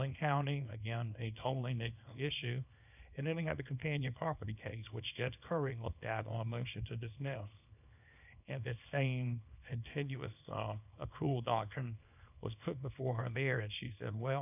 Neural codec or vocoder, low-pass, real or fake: codec, 24 kHz, 0.9 kbps, WavTokenizer, small release; 3.6 kHz; fake